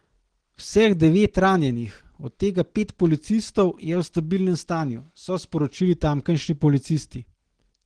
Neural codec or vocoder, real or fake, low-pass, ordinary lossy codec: none; real; 9.9 kHz; Opus, 16 kbps